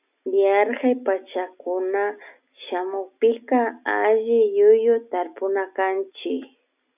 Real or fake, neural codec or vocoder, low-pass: real; none; 3.6 kHz